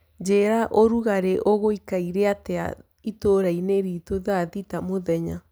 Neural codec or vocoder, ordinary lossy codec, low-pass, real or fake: none; none; none; real